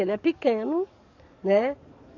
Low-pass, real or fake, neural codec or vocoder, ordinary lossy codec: 7.2 kHz; fake; codec, 44.1 kHz, 7.8 kbps, DAC; none